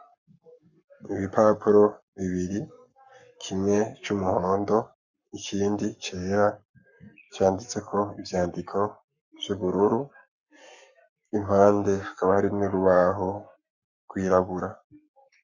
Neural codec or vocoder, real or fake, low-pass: codec, 44.1 kHz, 7.8 kbps, Pupu-Codec; fake; 7.2 kHz